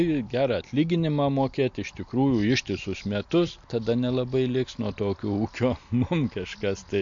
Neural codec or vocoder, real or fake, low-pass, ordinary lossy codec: none; real; 7.2 kHz; MP3, 48 kbps